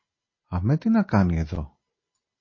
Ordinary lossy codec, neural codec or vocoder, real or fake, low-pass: MP3, 32 kbps; none; real; 7.2 kHz